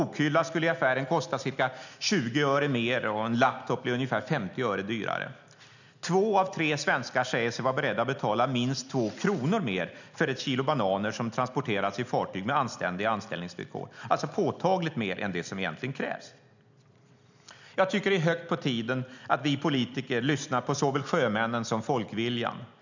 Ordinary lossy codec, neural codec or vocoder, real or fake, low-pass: none; none; real; 7.2 kHz